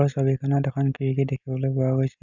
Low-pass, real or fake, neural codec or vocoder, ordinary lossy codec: 7.2 kHz; real; none; none